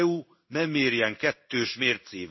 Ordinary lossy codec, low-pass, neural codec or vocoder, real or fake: MP3, 24 kbps; 7.2 kHz; codec, 16 kHz in and 24 kHz out, 1 kbps, XY-Tokenizer; fake